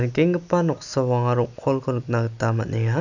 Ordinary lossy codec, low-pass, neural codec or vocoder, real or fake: none; 7.2 kHz; none; real